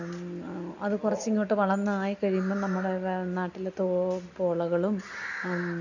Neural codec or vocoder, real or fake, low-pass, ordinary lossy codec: none; real; 7.2 kHz; none